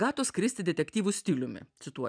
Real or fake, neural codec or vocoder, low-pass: real; none; 9.9 kHz